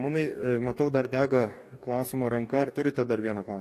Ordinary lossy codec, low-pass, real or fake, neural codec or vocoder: AAC, 64 kbps; 14.4 kHz; fake; codec, 44.1 kHz, 2.6 kbps, DAC